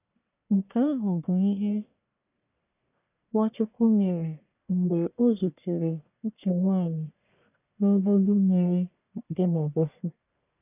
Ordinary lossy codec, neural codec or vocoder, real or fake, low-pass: none; codec, 44.1 kHz, 1.7 kbps, Pupu-Codec; fake; 3.6 kHz